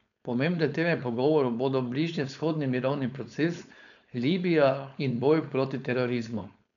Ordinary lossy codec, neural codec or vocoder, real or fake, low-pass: none; codec, 16 kHz, 4.8 kbps, FACodec; fake; 7.2 kHz